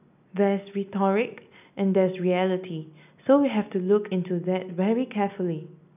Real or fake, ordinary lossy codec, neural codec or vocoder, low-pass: real; none; none; 3.6 kHz